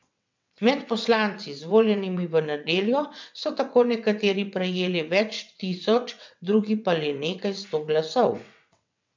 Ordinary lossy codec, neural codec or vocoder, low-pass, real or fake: MP3, 64 kbps; vocoder, 22.05 kHz, 80 mel bands, WaveNeXt; 7.2 kHz; fake